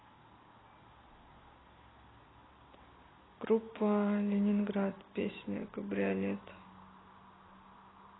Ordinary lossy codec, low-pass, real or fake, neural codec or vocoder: AAC, 16 kbps; 7.2 kHz; real; none